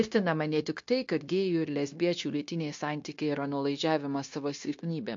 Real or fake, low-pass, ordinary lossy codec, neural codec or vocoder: fake; 7.2 kHz; MP3, 48 kbps; codec, 16 kHz, 0.9 kbps, LongCat-Audio-Codec